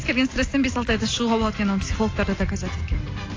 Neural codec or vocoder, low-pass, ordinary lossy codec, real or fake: none; 7.2 kHz; AAC, 32 kbps; real